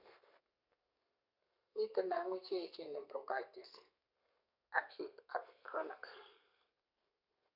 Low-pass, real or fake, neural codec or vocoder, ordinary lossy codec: 5.4 kHz; fake; codec, 32 kHz, 1.9 kbps, SNAC; none